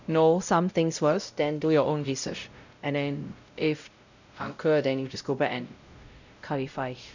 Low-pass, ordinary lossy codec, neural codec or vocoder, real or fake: 7.2 kHz; none; codec, 16 kHz, 0.5 kbps, X-Codec, WavLM features, trained on Multilingual LibriSpeech; fake